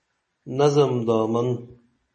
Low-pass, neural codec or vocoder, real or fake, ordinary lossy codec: 10.8 kHz; none; real; MP3, 32 kbps